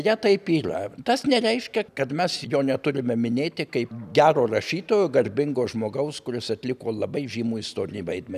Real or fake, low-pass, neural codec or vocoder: real; 14.4 kHz; none